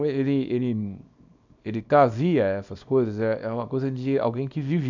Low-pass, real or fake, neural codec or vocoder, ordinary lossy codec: 7.2 kHz; fake; codec, 24 kHz, 0.9 kbps, WavTokenizer, small release; none